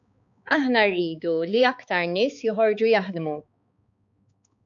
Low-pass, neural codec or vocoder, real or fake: 7.2 kHz; codec, 16 kHz, 4 kbps, X-Codec, HuBERT features, trained on balanced general audio; fake